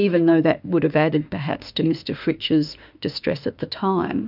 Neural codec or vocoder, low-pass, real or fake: autoencoder, 48 kHz, 32 numbers a frame, DAC-VAE, trained on Japanese speech; 5.4 kHz; fake